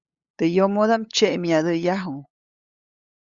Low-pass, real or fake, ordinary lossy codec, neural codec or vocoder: 7.2 kHz; fake; Opus, 64 kbps; codec, 16 kHz, 8 kbps, FunCodec, trained on LibriTTS, 25 frames a second